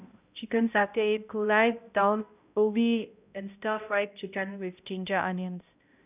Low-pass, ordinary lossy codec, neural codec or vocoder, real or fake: 3.6 kHz; none; codec, 16 kHz, 0.5 kbps, X-Codec, HuBERT features, trained on balanced general audio; fake